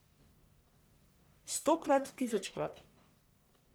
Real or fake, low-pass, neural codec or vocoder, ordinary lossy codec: fake; none; codec, 44.1 kHz, 1.7 kbps, Pupu-Codec; none